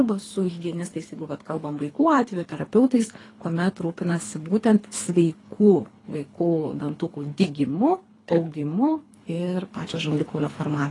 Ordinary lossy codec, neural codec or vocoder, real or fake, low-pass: AAC, 32 kbps; codec, 24 kHz, 3 kbps, HILCodec; fake; 10.8 kHz